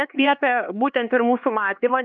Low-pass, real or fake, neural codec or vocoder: 7.2 kHz; fake; codec, 16 kHz, 2 kbps, X-Codec, HuBERT features, trained on LibriSpeech